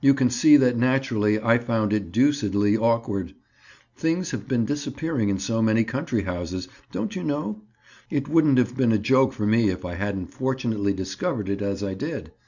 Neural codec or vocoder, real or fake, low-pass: none; real; 7.2 kHz